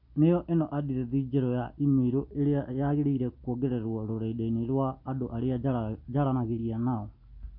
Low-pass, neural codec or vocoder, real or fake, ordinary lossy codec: 5.4 kHz; none; real; none